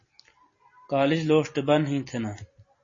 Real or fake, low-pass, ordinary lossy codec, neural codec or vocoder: real; 7.2 kHz; MP3, 32 kbps; none